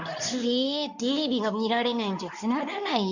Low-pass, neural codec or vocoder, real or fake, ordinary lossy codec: 7.2 kHz; codec, 24 kHz, 0.9 kbps, WavTokenizer, medium speech release version 2; fake; none